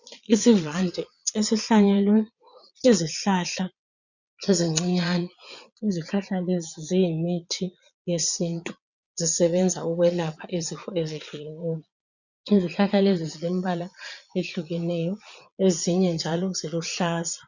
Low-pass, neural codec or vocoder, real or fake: 7.2 kHz; vocoder, 22.05 kHz, 80 mel bands, Vocos; fake